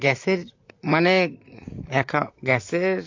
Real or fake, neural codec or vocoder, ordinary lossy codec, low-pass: fake; vocoder, 44.1 kHz, 128 mel bands, Pupu-Vocoder; AAC, 48 kbps; 7.2 kHz